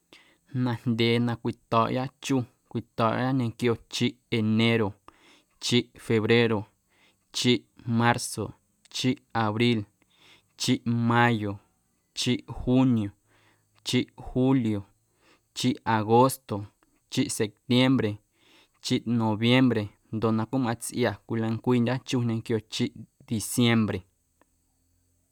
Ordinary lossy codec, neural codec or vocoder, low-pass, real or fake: none; none; 19.8 kHz; real